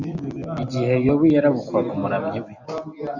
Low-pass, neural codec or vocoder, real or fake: 7.2 kHz; none; real